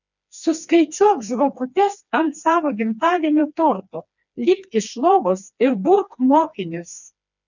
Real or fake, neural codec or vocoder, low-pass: fake; codec, 16 kHz, 2 kbps, FreqCodec, smaller model; 7.2 kHz